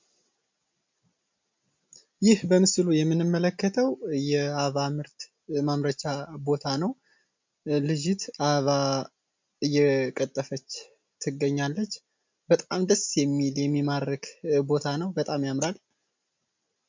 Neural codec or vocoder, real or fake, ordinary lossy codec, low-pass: none; real; MP3, 64 kbps; 7.2 kHz